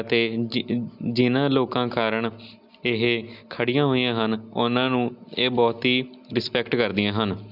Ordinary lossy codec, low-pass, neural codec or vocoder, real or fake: none; 5.4 kHz; none; real